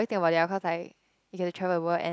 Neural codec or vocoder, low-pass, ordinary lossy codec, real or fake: none; none; none; real